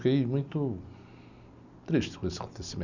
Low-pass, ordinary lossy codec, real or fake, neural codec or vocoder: 7.2 kHz; none; real; none